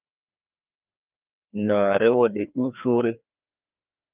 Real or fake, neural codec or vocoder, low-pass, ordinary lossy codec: fake; codec, 16 kHz, 2 kbps, FreqCodec, larger model; 3.6 kHz; Opus, 32 kbps